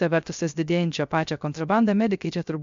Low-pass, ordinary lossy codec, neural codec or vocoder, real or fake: 7.2 kHz; MP3, 64 kbps; codec, 16 kHz, 0.3 kbps, FocalCodec; fake